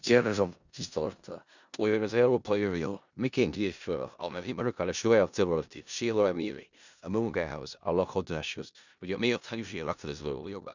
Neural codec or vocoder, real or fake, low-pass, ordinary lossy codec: codec, 16 kHz in and 24 kHz out, 0.4 kbps, LongCat-Audio-Codec, four codebook decoder; fake; 7.2 kHz; none